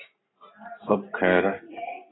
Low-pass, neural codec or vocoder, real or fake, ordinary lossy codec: 7.2 kHz; none; real; AAC, 16 kbps